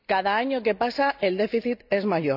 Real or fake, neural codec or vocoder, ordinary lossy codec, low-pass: real; none; none; 5.4 kHz